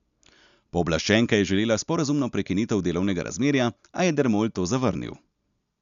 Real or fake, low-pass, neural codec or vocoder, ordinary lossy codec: real; 7.2 kHz; none; none